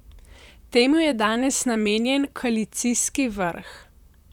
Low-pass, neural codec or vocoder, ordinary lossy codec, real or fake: 19.8 kHz; vocoder, 44.1 kHz, 128 mel bands, Pupu-Vocoder; none; fake